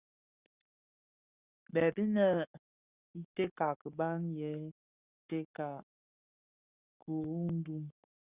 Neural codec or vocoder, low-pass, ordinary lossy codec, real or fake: codec, 44.1 kHz, 7.8 kbps, Pupu-Codec; 3.6 kHz; Opus, 64 kbps; fake